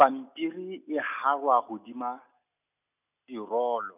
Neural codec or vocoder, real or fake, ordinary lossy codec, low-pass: none; real; none; 3.6 kHz